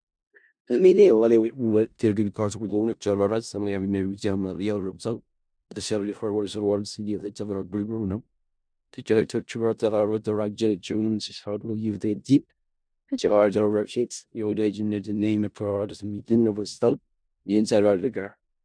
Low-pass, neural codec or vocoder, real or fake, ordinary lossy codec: 9.9 kHz; codec, 16 kHz in and 24 kHz out, 0.4 kbps, LongCat-Audio-Codec, four codebook decoder; fake; MP3, 96 kbps